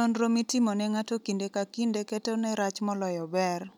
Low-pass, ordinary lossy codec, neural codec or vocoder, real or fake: 19.8 kHz; none; none; real